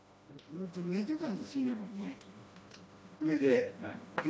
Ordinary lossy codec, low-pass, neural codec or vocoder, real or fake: none; none; codec, 16 kHz, 1 kbps, FreqCodec, smaller model; fake